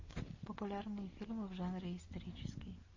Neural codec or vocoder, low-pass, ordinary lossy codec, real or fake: none; 7.2 kHz; MP3, 32 kbps; real